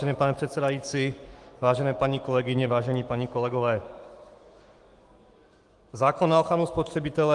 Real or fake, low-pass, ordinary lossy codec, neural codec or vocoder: fake; 10.8 kHz; Opus, 24 kbps; autoencoder, 48 kHz, 128 numbers a frame, DAC-VAE, trained on Japanese speech